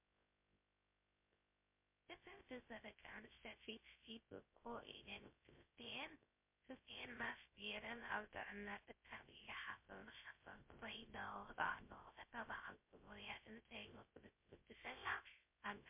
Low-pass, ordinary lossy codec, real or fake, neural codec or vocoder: 3.6 kHz; MP3, 24 kbps; fake; codec, 16 kHz, 0.3 kbps, FocalCodec